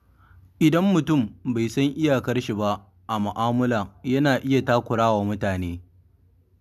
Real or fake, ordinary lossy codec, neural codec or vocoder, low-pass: real; none; none; 14.4 kHz